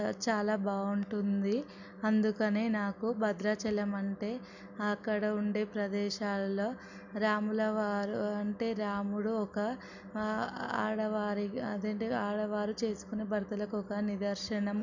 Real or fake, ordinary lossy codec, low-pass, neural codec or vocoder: real; none; 7.2 kHz; none